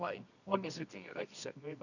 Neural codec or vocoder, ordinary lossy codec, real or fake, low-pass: codec, 24 kHz, 0.9 kbps, WavTokenizer, medium music audio release; none; fake; 7.2 kHz